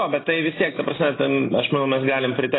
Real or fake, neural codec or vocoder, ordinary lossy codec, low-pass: real; none; AAC, 16 kbps; 7.2 kHz